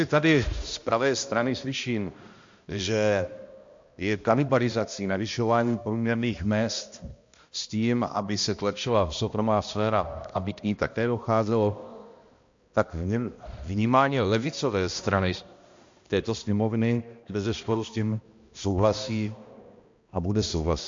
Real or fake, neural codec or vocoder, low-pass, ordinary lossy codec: fake; codec, 16 kHz, 1 kbps, X-Codec, HuBERT features, trained on balanced general audio; 7.2 kHz; MP3, 48 kbps